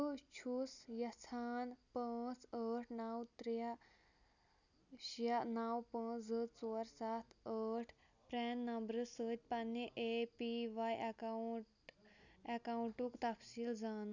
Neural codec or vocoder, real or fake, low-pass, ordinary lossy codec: none; real; 7.2 kHz; none